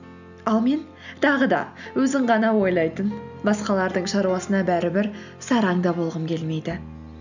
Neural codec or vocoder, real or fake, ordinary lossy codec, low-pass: none; real; none; 7.2 kHz